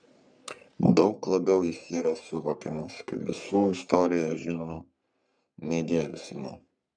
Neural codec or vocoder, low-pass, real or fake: codec, 44.1 kHz, 3.4 kbps, Pupu-Codec; 9.9 kHz; fake